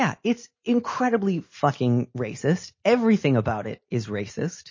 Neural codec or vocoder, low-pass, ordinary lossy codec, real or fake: none; 7.2 kHz; MP3, 32 kbps; real